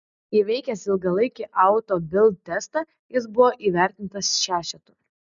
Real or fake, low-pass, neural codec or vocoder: real; 7.2 kHz; none